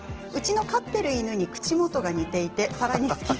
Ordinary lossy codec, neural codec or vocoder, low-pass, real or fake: Opus, 16 kbps; none; 7.2 kHz; real